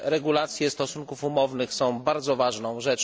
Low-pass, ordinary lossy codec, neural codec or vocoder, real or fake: none; none; none; real